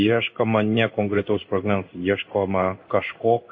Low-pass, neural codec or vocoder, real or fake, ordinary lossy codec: 7.2 kHz; codec, 16 kHz in and 24 kHz out, 1 kbps, XY-Tokenizer; fake; MP3, 32 kbps